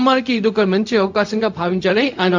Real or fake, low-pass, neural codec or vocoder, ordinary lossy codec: fake; 7.2 kHz; codec, 16 kHz, 0.4 kbps, LongCat-Audio-Codec; MP3, 48 kbps